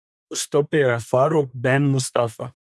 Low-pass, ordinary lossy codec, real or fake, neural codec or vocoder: none; none; fake; codec, 24 kHz, 1 kbps, SNAC